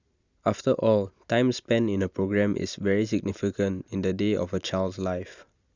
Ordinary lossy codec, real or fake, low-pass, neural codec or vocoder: Opus, 64 kbps; real; 7.2 kHz; none